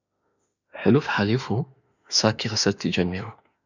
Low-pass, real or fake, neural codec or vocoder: 7.2 kHz; fake; autoencoder, 48 kHz, 32 numbers a frame, DAC-VAE, trained on Japanese speech